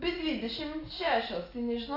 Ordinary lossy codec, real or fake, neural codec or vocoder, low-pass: AAC, 24 kbps; real; none; 5.4 kHz